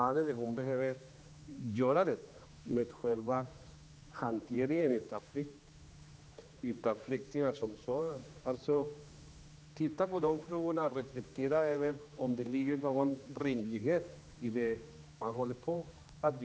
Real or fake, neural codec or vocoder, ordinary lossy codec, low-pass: fake; codec, 16 kHz, 2 kbps, X-Codec, HuBERT features, trained on general audio; none; none